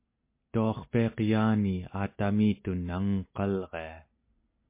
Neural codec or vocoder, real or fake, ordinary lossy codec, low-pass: none; real; MP3, 24 kbps; 3.6 kHz